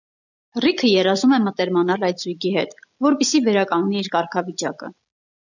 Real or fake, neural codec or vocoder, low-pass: real; none; 7.2 kHz